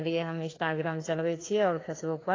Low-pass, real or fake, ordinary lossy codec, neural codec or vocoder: 7.2 kHz; fake; AAC, 32 kbps; codec, 16 kHz, 2 kbps, FreqCodec, larger model